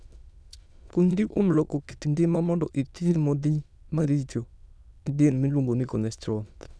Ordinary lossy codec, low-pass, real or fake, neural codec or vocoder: none; none; fake; autoencoder, 22.05 kHz, a latent of 192 numbers a frame, VITS, trained on many speakers